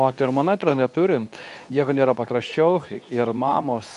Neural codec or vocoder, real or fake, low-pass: codec, 24 kHz, 0.9 kbps, WavTokenizer, medium speech release version 2; fake; 10.8 kHz